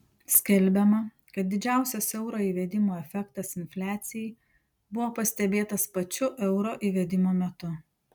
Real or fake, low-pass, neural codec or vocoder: real; 19.8 kHz; none